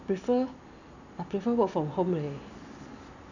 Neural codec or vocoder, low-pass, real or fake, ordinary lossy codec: none; 7.2 kHz; real; none